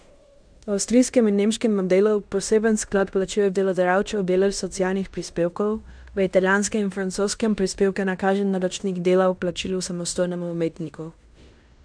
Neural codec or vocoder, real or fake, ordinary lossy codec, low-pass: codec, 16 kHz in and 24 kHz out, 0.9 kbps, LongCat-Audio-Codec, fine tuned four codebook decoder; fake; none; 9.9 kHz